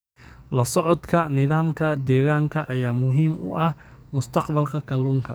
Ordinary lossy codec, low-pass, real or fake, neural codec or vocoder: none; none; fake; codec, 44.1 kHz, 2.6 kbps, SNAC